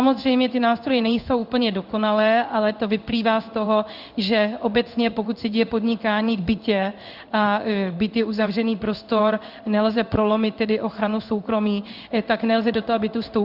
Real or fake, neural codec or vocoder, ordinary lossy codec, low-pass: fake; codec, 16 kHz in and 24 kHz out, 1 kbps, XY-Tokenizer; Opus, 64 kbps; 5.4 kHz